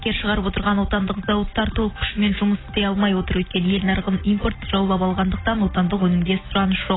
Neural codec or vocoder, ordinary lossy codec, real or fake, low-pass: none; AAC, 16 kbps; real; 7.2 kHz